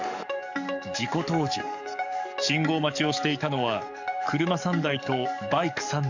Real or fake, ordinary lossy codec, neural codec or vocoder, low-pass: fake; none; codec, 44.1 kHz, 7.8 kbps, DAC; 7.2 kHz